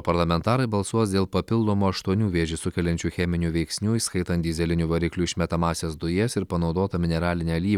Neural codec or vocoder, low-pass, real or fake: none; 19.8 kHz; real